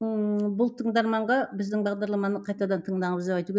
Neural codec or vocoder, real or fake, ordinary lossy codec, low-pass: none; real; none; none